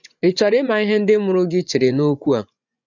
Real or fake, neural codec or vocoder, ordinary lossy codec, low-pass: fake; vocoder, 24 kHz, 100 mel bands, Vocos; none; 7.2 kHz